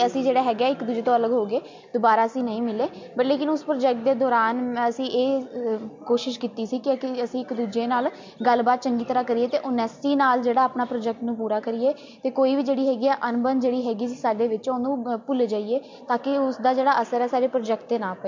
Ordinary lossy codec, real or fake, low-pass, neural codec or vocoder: MP3, 48 kbps; real; 7.2 kHz; none